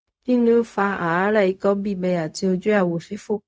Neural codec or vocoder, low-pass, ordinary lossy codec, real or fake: codec, 16 kHz, 0.4 kbps, LongCat-Audio-Codec; none; none; fake